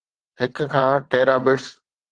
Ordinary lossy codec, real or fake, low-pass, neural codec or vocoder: Opus, 16 kbps; fake; 9.9 kHz; vocoder, 22.05 kHz, 80 mel bands, WaveNeXt